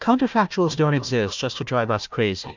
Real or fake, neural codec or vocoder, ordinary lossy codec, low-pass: fake; codec, 16 kHz, 1 kbps, FunCodec, trained on Chinese and English, 50 frames a second; MP3, 64 kbps; 7.2 kHz